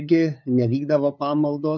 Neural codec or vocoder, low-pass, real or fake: codec, 16 kHz, 8 kbps, FunCodec, trained on LibriTTS, 25 frames a second; 7.2 kHz; fake